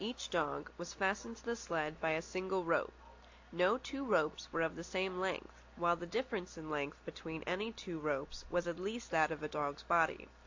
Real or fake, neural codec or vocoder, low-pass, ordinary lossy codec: real; none; 7.2 kHz; AAC, 48 kbps